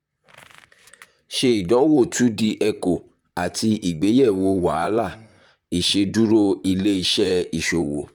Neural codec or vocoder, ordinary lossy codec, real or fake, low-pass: vocoder, 44.1 kHz, 128 mel bands, Pupu-Vocoder; none; fake; 19.8 kHz